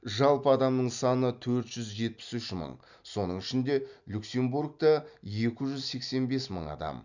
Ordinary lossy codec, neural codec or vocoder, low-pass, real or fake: none; none; 7.2 kHz; real